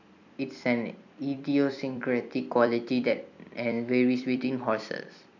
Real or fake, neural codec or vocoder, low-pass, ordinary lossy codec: fake; vocoder, 44.1 kHz, 128 mel bands every 256 samples, BigVGAN v2; 7.2 kHz; none